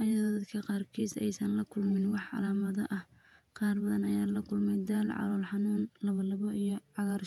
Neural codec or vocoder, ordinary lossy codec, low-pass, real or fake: vocoder, 48 kHz, 128 mel bands, Vocos; none; 19.8 kHz; fake